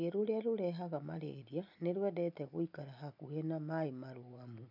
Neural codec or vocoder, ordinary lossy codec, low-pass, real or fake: none; none; 5.4 kHz; real